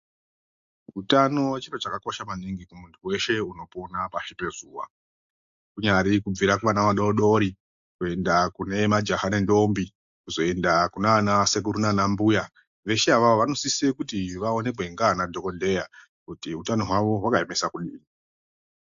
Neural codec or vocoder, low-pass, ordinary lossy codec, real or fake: none; 7.2 kHz; AAC, 64 kbps; real